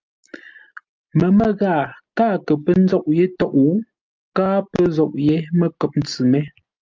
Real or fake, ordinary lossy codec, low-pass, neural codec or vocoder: real; Opus, 24 kbps; 7.2 kHz; none